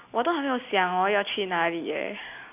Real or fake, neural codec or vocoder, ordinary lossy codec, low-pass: real; none; none; 3.6 kHz